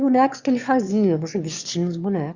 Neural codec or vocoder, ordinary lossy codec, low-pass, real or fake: autoencoder, 22.05 kHz, a latent of 192 numbers a frame, VITS, trained on one speaker; Opus, 64 kbps; 7.2 kHz; fake